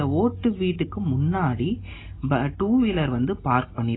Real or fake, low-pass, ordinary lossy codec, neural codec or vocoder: real; 7.2 kHz; AAC, 16 kbps; none